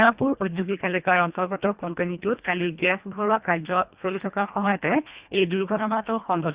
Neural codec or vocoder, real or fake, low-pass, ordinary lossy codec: codec, 24 kHz, 1.5 kbps, HILCodec; fake; 3.6 kHz; Opus, 64 kbps